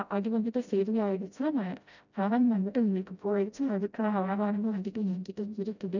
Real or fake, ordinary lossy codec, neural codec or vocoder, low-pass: fake; none; codec, 16 kHz, 0.5 kbps, FreqCodec, smaller model; 7.2 kHz